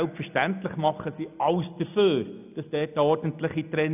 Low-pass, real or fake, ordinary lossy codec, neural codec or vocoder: 3.6 kHz; real; none; none